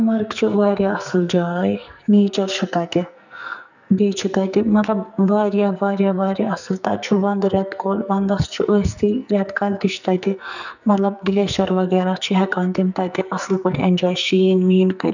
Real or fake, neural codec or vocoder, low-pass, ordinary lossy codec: fake; codec, 44.1 kHz, 2.6 kbps, SNAC; 7.2 kHz; none